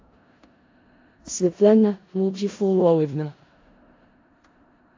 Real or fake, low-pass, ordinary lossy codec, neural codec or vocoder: fake; 7.2 kHz; AAC, 32 kbps; codec, 16 kHz in and 24 kHz out, 0.4 kbps, LongCat-Audio-Codec, four codebook decoder